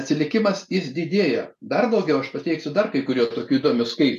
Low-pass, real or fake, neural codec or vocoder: 14.4 kHz; real; none